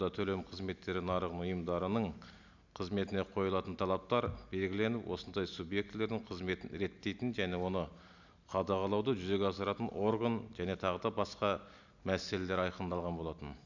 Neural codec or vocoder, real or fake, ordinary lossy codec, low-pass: none; real; none; 7.2 kHz